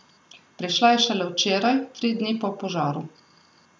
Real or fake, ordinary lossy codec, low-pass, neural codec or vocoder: real; none; none; none